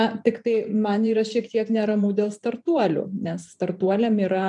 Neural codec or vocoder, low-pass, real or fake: vocoder, 44.1 kHz, 128 mel bands every 512 samples, BigVGAN v2; 10.8 kHz; fake